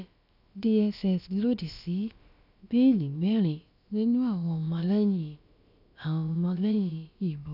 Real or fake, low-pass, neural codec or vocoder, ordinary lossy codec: fake; 5.4 kHz; codec, 16 kHz, about 1 kbps, DyCAST, with the encoder's durations; none